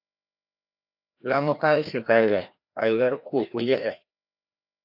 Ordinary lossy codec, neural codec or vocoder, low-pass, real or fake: AAC, 48 kbps; codec, 16 kHz, 1 kbps, FreqCodec, larger model; 5.4 kHz; fake